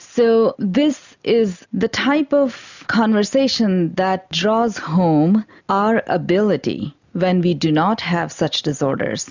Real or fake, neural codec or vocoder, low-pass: real; none; 7.2 kHz